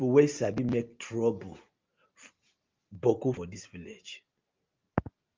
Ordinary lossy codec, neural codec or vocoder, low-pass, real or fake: Opus, 24 kbps; none; 7.2 kHz; real